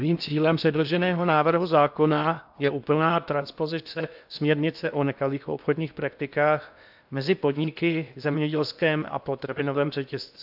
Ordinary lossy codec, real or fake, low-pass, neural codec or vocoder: AAC, 48 kbps; fake; 5.4 kHz; codec, 16 kHz in and 24 kHz out, 0.6 kbps, FocalCodec, streaming, 2048 codes